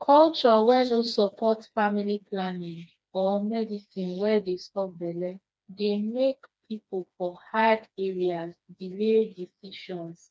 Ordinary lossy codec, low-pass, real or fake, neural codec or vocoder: none; none; fake; codec, 16 kHz, 2 kbps, FreqCodec, smaller model